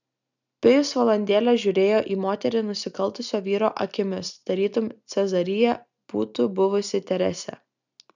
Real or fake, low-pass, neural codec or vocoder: real; 7.2 kHz; none